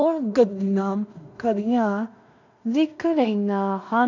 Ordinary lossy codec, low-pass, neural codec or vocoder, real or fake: none; 7.2 kHz; codec, 16 kHz in and 24 kHz out, 0.4 kbps, LongCat-Audio-Codec, two codebook decoder; fake